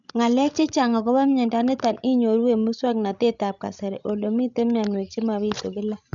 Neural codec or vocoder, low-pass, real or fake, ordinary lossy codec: codec, 16 kHz, 16 kbps, FreqCodec, larger model; 7.2 kHz; fake; none